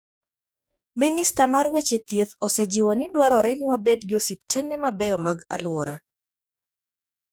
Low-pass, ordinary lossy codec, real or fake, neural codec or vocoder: none; none; fake; codec, 44.1 kHz, 2.6 kbps, DAC